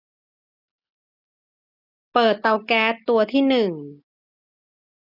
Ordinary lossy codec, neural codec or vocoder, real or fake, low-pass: none; none; real; 5.4 kHz